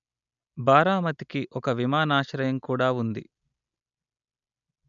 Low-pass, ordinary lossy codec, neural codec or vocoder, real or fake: 7.2 kHz; none; none; real